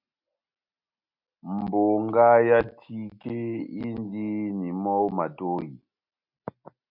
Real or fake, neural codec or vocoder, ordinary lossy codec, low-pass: real; none; MP3, 48 kbps; 5.4 kHz